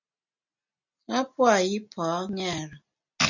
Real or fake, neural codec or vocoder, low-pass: real; none; 7.2 kHz